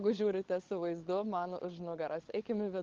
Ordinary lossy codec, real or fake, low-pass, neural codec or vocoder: Opus, 16 kbps; fake; 7.2 kHz; codec, 16 kHz, 16 kbps, FunCodec, trained on LibriTTS, 50 frames a second